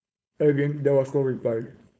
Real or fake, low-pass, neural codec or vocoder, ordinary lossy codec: fake; none; codec, 16 kHz, 4.8 kbps, FACodec; none